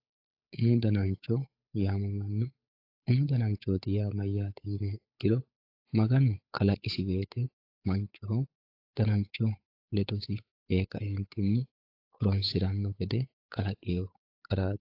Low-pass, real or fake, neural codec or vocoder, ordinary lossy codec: 5.4 kHz; fake; codec, 16 kHz, 8 kbps, FunCodec, trained on Chinese and English, 25 frames a second; AAC, 32 kbps